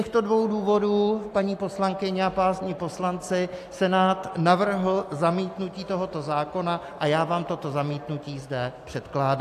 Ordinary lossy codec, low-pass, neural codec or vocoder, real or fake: AAC, 64 kbps; 14.4 kHz; none; real